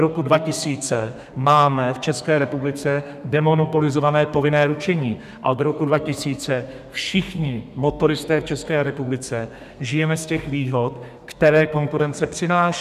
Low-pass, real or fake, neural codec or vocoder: 14.4 kHz; fake; codec, 32 kHz, 1.9 kbps, SNAC